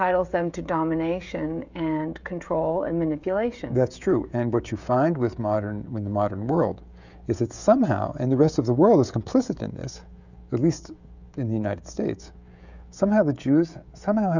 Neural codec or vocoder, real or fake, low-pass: codec, 16 kHz, 16 kbps, FreqCodec, smaller model; fake; 7.2 kHz